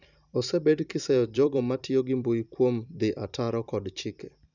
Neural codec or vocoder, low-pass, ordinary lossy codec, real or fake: vocoder, 44.1 kHz, 128 mel bands every 256 samples, BigVGAN v2; 7.2 kHz; none; fake